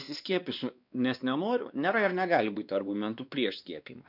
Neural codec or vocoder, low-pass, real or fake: codec, 16 kHz, 2 kbps, X-Codec, WavLM features, trained on Multilingual LibriSpeech; 5.4 kHz; fake